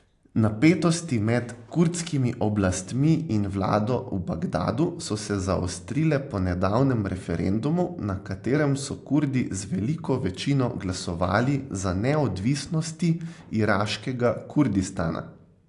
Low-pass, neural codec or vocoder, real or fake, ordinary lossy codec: 10.8 kHz; none; real; AAC, 64 kbps